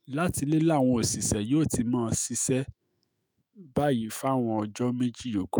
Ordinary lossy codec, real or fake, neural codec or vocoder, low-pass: none; fake; autoencoder, 48 kHz, 128 numbers a frame, DAC-VAE, trained on Japanese speech; none